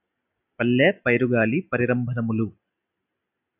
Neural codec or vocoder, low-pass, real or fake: none; 3.6 kHz; real